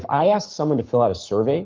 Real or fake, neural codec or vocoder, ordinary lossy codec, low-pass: fake; codec, 44.1 kHz, 7.8 kbps, Pupu-Codec; Opus, 32 kbps; 7.2 kHz